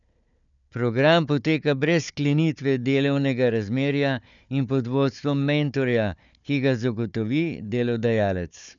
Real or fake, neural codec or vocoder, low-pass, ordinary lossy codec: fake; codec, 16 kHz, 4 kbps, FunCodec, trained on Chinese and English, 50 frames a second; 7.2 kHz; none